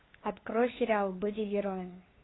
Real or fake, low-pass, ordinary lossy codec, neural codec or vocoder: fake; 7.2 kHz; AAC, 16 kbps; codec, 16 kHz, 8 kbps, FunCodec, trained on LibriTTS, 25 frames a second